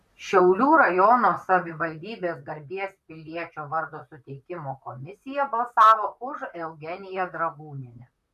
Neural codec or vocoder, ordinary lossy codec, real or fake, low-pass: vocoder, 44.1 kHz, 128 mel bands, Pupu-Vocoder; MP3, 96 kbps; fake; 14.4 kHz